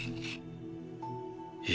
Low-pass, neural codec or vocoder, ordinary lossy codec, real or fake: none; none; none; real